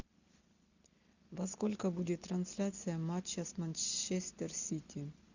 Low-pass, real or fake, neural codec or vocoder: 7.2 kHz; real; none